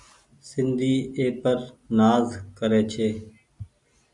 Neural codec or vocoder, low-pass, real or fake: none; 10.8 kHz; real